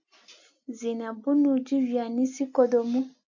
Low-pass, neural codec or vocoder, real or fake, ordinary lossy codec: 7.2 kHz; none; real; AAC, 48 kbps